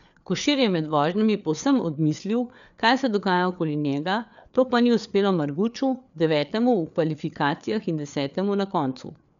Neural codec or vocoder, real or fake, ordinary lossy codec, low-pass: codec, 16 kHz, 4 kbps, FreqCodec, larger model; fake; none; 7.2 kHz